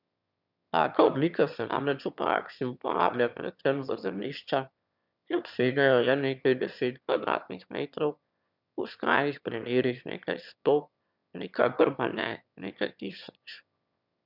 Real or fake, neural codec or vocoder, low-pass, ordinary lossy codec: fake; autoencoder, 22.05 kHz, a latent of 192 numbers a frame, VITS, trained on one speaker; 5.4 kHz; none